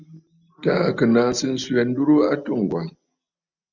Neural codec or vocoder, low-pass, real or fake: none; 7.2 kHz; real